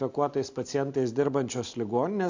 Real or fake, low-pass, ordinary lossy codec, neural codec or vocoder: real; 7.2 kHz; AAC, 48 kbps; none